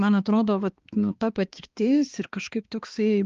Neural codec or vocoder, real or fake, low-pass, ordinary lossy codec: codec, 16 kHz, 2 kbps, X-Codec, HuBERT features, trained on balanced general audio; fake; 7.2 kHz; Opus, 32 kbps